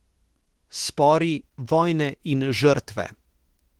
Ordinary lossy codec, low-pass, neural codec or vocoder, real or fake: Opus, 16 kbps; 19.8 kHz; autoencoder, 48 kHz, 32 numbers a frame, DAC-VAE, trained on Japanese speech; fake